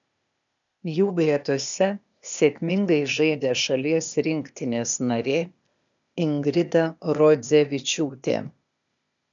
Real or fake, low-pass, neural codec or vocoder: fake; 7.2 kHz; codec, 16 kHz, 0.8 kbps, ZipCodec